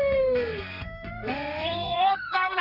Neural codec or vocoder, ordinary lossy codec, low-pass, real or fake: codec, 32 kHz, 1.9 kbps, SNAC; none; 5.4 kHz; fake